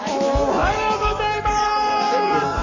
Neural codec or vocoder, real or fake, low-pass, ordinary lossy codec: codec, 44.1 kHz, 7.8 kbps, Pupu-Codec; fake; 7.2 kHz; none